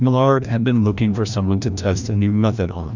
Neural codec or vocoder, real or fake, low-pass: codec, 16 kHz, 1 kbps, FreqCodec, larger model; fake; 7.2 kHz